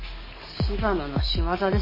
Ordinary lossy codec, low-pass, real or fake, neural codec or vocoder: MP3, 24 kbps; 5.4 kHz; fake; codec, 44.1 kHz, 7.8 kbps, DAC